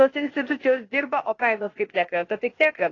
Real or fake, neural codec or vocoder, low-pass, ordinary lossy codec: fake; codec, 16 kHz, 0.8 kbps, ZipCodec; 7.2 kHz; AAC, 32 kbps